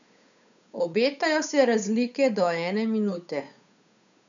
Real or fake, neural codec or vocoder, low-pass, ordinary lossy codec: fake; codec, 16 kHz, 8 kbps, FunCodec, trained on Chinese and English, 25 frames a second; 7.2 kHz; none